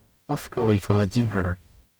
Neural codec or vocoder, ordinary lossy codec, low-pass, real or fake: codec, 44.1 kHz, 0.9 kbps, DAC; none; none; fake